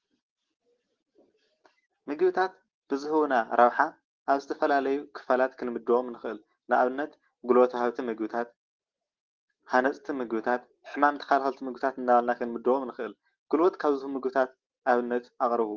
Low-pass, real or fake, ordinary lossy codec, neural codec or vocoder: 7.2 kHz; real; Opus, 16 kbps; none